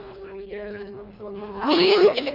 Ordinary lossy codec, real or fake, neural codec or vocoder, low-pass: none; fake; codec, 24 kHz, 1.5 kbps, HILCodec; 5.4 kHz